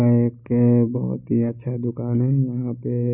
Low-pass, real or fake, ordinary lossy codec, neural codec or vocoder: 3.6 kHz; real; none; none